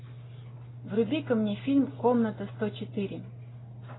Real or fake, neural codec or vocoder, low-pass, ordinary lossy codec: real; none; 7.2 kHz; AAC, 16 kbps